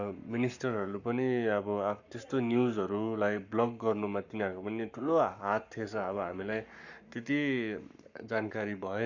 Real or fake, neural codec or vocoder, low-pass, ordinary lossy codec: fake; codec, 44.1 kHz, 7.8 kbps, Pupu-Codec; 7.2 kHz; none